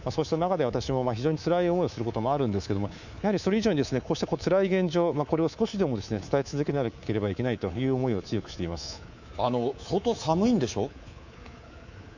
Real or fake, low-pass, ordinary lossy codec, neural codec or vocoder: fake; 7.2 kHz; none; codec, 24 kHz, 3.1 kbps, DualCodec